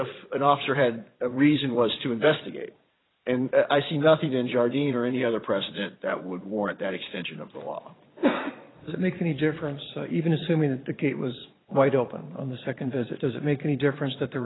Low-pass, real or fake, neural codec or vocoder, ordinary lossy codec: 7.2 kHz; fake; vocoder, 22.05 kHz, 80 mel bands, Vocos; AAC, 16 kbps